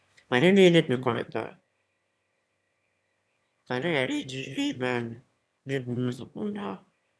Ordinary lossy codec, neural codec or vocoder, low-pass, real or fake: none; autoencoder, 22.05 kHz, a latent of 192 numbers a frame, VITS, trained on one speaker; none; fake